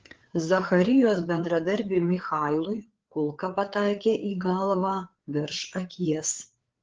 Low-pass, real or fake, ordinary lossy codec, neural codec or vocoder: 7.2 kHz; fake; Opus, 16 kbps; codec, 16 kHz, 4 kbps, FreqCodec, larger model